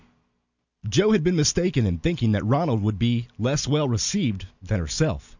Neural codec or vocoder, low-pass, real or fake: none; 7.2 kHz; real